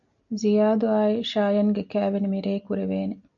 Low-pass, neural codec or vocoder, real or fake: 7.2 kHz; none; real